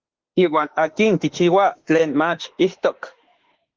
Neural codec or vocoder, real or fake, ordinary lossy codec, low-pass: autoencoder, 48 kHz, 32 numbers a frame, DAC-VAE, trained on Japanese speech; fake; Opus, 16 kbps; 7.2 kHz